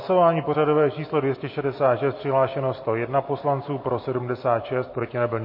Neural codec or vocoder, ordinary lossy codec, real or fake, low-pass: none; MP3, 24 kbps; real; 5.4 kHz